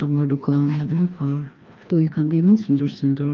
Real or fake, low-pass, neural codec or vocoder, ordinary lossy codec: fake; 7.2 kHz; codec, 16 kHz, 1 kbps, FreqCodec, larger model; Opus, 32 kbps